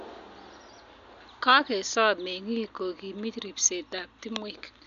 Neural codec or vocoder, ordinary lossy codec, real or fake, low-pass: none; none; real; 7.2 kHz